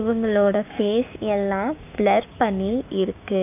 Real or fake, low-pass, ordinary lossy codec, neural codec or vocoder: fake; 3.6 kHz; AAC, 32 kbps; codec, 16 kHz, 6 kbps, DAC